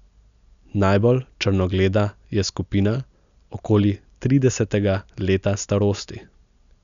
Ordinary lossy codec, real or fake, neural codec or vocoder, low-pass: none; real; none; 7.2 kHz